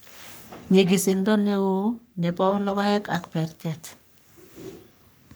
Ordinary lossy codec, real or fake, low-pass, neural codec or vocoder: none; fake; none; codec, 44.1 kHz, 3.4 kbps, Pupu-Codec